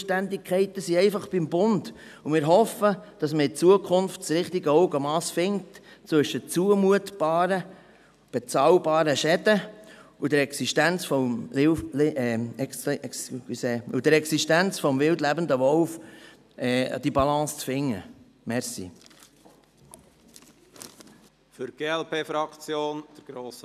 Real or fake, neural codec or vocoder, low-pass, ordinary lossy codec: real; none; 14.4 kHz; none